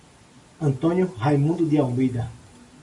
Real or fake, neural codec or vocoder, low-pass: real; none; 10.8 kHz